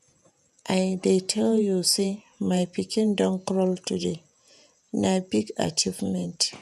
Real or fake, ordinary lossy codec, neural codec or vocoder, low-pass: fake; none; vocoder, 48 kHz, 128 mel bands, Vocos; 14.4 kHz